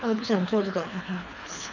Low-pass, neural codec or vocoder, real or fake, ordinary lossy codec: 7.2 kHz; codec, 24 kHz, 3 kbps, HILCodec; fake; none